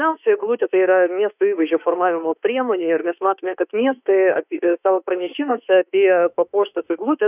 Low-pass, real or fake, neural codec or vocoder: 3.6 kHz; fake; autoencoder, 48 kHz, 32 numbers a frame, DAC-VAE, trained on Japanese speech